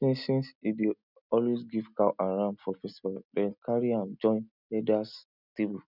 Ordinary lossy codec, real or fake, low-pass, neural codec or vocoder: none; real; 5.4 kHz; none